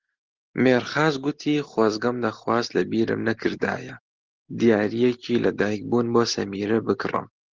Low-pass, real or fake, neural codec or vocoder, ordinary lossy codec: 7.2 kHz; real; none; Opus, 16 kbps